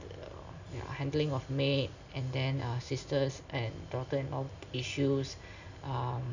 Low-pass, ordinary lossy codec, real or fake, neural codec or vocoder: 7.2 kHz; none; real; none